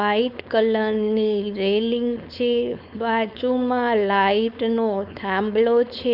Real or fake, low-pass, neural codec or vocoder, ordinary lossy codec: fake; 5.4 kHz; codec, 16 kHz, 4.8 kbps, FACodec; none